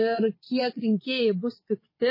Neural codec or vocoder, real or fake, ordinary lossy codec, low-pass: vocoder, 22.05 kHz, 80 mel bands, WaveNeXt; fake; MP3, 24 kbps; 5.4 kHz